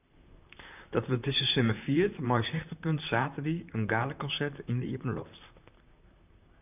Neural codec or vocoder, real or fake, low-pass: vocoder, 44.1 kHz, 128 mel bands, Pupu-Vocoder; fake; 3.6 kHz